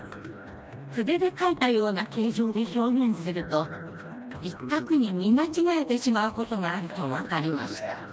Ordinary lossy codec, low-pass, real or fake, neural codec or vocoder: none; none; fake; codec, 16 kHz, 1 kbps, FreqCodec, smaller model